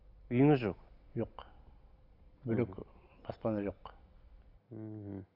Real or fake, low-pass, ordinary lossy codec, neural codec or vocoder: real; 5.4 kHz; none; none